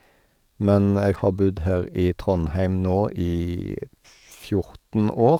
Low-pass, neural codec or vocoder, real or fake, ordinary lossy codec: 19.8 kHz; codec, 44.1 kHz, 7.8 kbps, DAC; fake; none